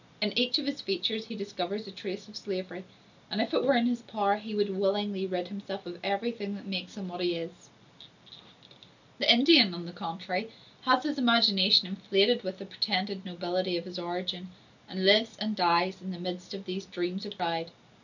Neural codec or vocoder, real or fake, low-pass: none; real; 7.2 kHz